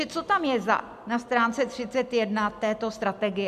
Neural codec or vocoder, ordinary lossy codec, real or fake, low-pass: none; MP3, 96 kbps; real; 14.4 kHz